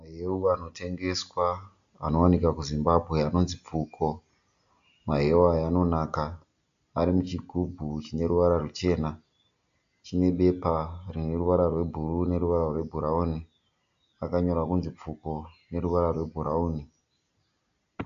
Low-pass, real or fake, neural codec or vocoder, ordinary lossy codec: 7.2 kHz; real; none; AAC, 64 kbps